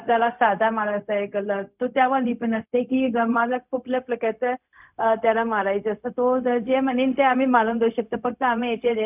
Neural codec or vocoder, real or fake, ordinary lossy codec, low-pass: codec, 16 kHz, 0.4 kbps, LongCat-Audio-Codec; fake; none; 3.6 kHz